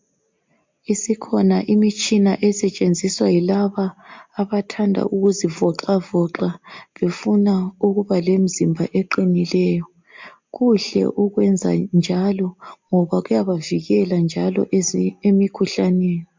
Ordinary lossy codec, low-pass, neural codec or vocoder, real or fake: MP3, 64 kbps; 7.2 kHz; none; real